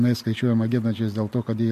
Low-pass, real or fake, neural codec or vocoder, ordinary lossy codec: 14.4 kHz; real; none; MP3, 64 kbps